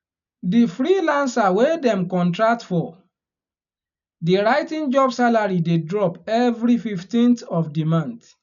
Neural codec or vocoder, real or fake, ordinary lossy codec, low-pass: none; real; none; 7.2 kHz